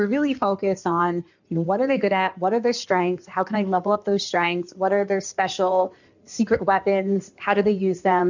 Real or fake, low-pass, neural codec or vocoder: fake; 7.2 kHz; codec, 16 kHz in and 24 kHz out, 2.2 kbps, FireRedTTS-2 codec